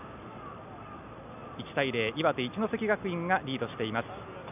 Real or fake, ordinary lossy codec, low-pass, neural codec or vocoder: real; none; 3.6 kHz; none